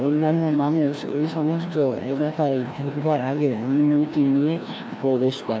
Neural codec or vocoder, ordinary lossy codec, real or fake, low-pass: codec, 16 kHz, 1 kbps, FreqCodec, larger model; none; fake; none